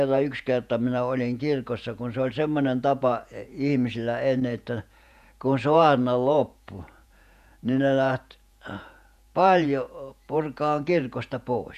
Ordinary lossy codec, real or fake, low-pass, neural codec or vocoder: none; fake; 14.4 kHz; vocoder, 48 kHz, 128 mel bands, Vocos